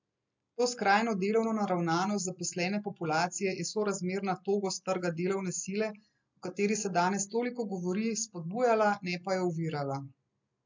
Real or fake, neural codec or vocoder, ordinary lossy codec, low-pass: real; none; MP3, 64 kbps; 7.2 kHz